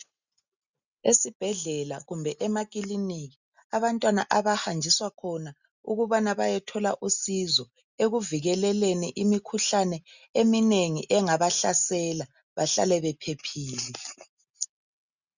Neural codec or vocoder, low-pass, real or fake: none; 7.2 kHz; real